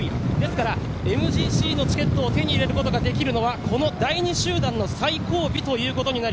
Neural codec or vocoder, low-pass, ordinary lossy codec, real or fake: none; none; none; real